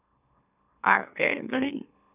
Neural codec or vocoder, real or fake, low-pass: autoencoder, 44.1 kHz, a latent of 192 numbers a frame, MeloTTS; fake; 3.6 kHz